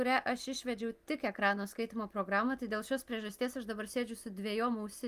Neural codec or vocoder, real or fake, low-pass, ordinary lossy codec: none; real; 14.4 kHz; Opus, 24 kbps